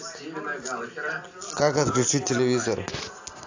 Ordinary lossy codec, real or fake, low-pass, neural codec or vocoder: AAC, 48 kbps; real; 7.2 kHz; none